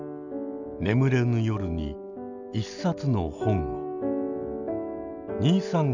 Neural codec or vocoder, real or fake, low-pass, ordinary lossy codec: none; real; 7.2 kHz; none